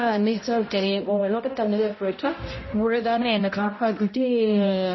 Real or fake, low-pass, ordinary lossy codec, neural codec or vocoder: fake; 7.2 kHz; MP3, 24 kbps; codec, 16 kHz, 0.5 kbps, X-Codec, HuBERT features, trained on balanced general audio